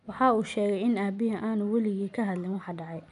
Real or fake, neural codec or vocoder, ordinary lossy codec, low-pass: real; none; none; 10.8 kHz